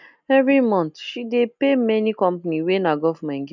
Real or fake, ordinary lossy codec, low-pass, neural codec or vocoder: real; none; 7.2 kHz; none